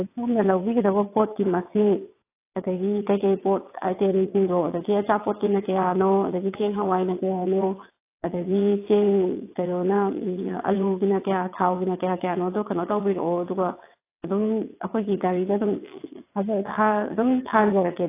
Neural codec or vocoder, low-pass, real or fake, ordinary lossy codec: vocoder, 22.05 kHz, 80 mel bands, WaveNeXt; 3.6 kHz; fake; AAC, 24 kbps